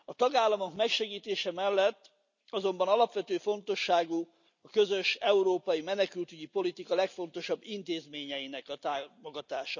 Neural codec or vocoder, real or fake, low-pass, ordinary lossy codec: none; real; 7.2 kHz; none